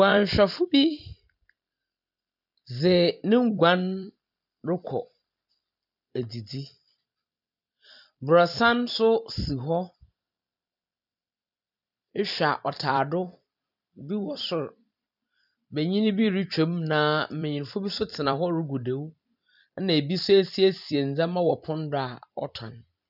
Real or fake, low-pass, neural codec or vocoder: fake; 5.4 kHz; vocoder, 44.1 kHz, 128 mel bands every 256 samples, BigVGAN v2